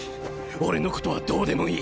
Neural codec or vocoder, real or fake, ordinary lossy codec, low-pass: none; real; none; none